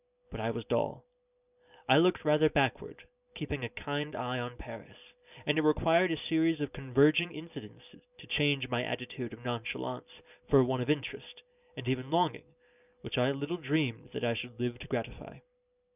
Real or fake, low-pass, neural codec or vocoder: real; 3.6 kHz; none